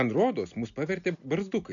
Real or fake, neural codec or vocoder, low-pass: real; none; 7.2 kHz